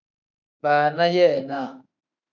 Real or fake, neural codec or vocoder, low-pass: fake; autoencoder, 48 kHz, 32 numbers a frame, DAC-VAE, trained on Japanese speech; 7.2 kHz